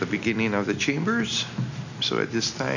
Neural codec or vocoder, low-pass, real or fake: none; 7.2 kHz; real